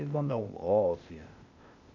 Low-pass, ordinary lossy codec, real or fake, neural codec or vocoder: 7.2 kHz; none; fake; codec, 16 kHz, 0.8 kbps, ZipCodec